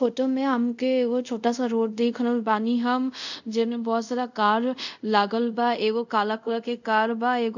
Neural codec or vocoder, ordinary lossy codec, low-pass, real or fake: codec, 24 kHz, 0.5 kbps, DualCodec; none; 7.2 kHz; fake